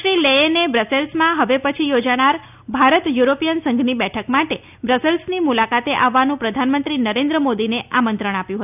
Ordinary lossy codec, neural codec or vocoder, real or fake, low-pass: none; none; real; 3.6 kHz